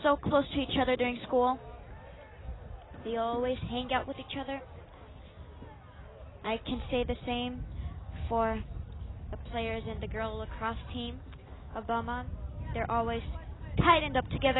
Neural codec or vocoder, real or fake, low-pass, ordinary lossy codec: none; real; 7.2 kHz; AAC, 16 kbps